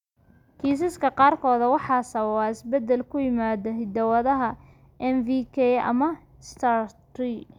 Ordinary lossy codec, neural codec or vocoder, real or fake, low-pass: none; none; real; 19.8 kHz